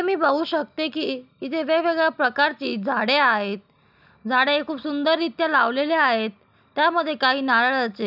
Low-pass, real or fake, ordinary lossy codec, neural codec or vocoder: 5.4 kHz; real; none; none